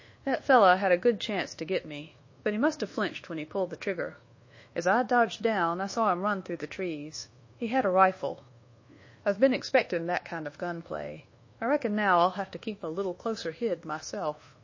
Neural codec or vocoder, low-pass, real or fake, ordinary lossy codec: codec, 24 kHz, 1.2 kbps, DualCodec; 7.2 kHz; fake; MP3, 32 kbps